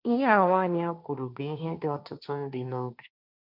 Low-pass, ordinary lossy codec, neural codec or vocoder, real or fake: 5.4 kHz; none; codec, 16 kHz, 1.1 kbps, Voila-Tokenizer; fake